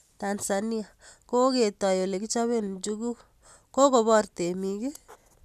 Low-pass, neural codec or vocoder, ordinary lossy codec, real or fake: 14.4 kHz; none; none; real